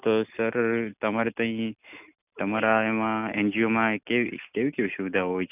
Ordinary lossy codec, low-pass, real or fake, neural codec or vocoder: none; 3.6 kHz; real; none